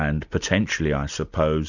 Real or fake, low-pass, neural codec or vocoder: real; 7.2 kHz; none